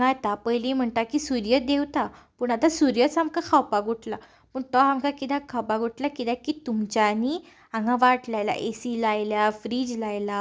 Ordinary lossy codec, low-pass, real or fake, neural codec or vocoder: none; none; real; none